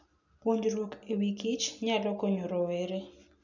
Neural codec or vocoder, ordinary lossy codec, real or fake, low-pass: none; none; real; 7.2 kHz